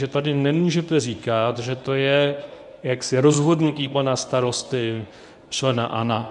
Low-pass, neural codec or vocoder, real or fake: 10.8 kHz; codec, 24 kHz, 0.9 kbps, WavTokenizer, medium speech release version 1; fake